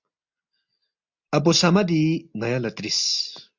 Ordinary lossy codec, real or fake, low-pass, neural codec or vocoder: MP3, 64 kbps; real; 7.2 kHz; none